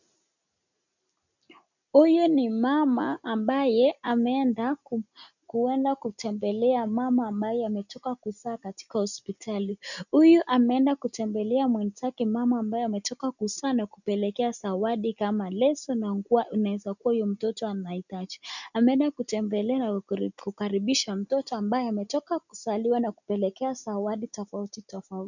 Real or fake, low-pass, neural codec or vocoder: real; 7.2 kHz; none